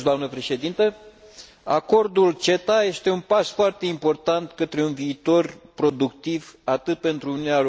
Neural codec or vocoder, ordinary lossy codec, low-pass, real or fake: none; none; none; real